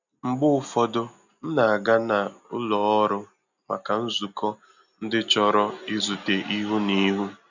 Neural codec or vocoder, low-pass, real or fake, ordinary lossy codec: none; 7.2 kHz; real; none